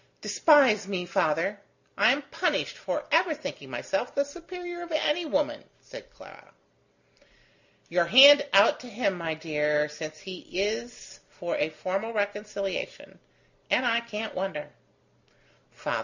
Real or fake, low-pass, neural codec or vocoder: real; 7.2 kHz; none